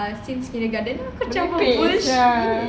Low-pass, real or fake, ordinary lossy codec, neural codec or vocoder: none; real; none; none